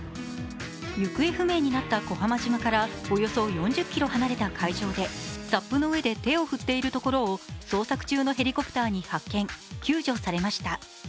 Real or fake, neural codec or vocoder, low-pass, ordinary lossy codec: real; none; none; none